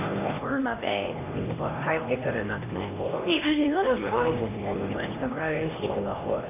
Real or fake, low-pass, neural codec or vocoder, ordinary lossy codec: fake; 3.6 kHz; codec, 16 kHz, 1 kbps, X-Codec, HuBERT features, trained on LibriSpeech; none